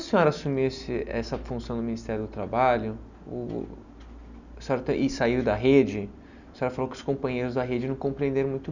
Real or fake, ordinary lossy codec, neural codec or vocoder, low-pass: real; none; none; 7.2 kHz